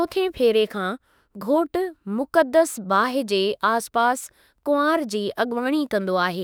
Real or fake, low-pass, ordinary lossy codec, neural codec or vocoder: fake; none; none; autoencoder, 48 kHz, 32 numbers a frame, DAC-VAE, trained on Japanese speech